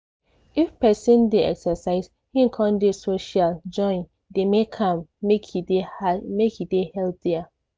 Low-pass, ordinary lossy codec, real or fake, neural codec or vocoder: none; none; real; none